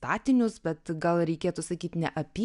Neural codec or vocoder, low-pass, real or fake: none; 10.8 kHz; real